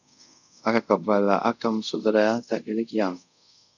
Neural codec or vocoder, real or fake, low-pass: codec, 24 kHz, 0.5 kbps, DualCodec; fake; 7.2 kHz